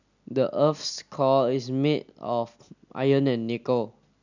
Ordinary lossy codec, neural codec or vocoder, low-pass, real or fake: none; none; 7.2 kHz; real